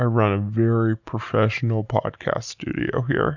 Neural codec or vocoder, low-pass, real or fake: none; 7.2 kHz; real